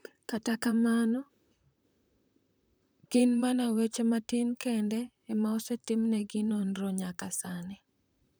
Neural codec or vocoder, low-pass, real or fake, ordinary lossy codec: vocoder, 44.1 kHz, 128 mel bands, Pupu-Vocoder; none; fake; none